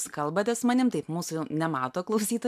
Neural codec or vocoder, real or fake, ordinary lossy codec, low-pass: none; real; MP3, 96 kbps; 14.4 kHz